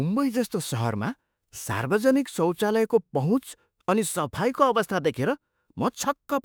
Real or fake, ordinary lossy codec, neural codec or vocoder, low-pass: fake; none; autoencoder, 48 kHz, 32 numbers a frame, DAC-VAE, trained on Japanese speech; none